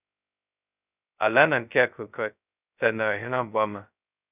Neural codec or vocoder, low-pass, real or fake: codec, 16 kHz, 0.2 kbps, FocalCodec; 3.6 kHz; fake